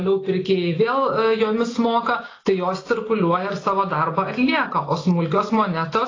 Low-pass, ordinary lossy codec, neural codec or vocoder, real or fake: 7.2 kHz; AAC, 32 kbps; none; real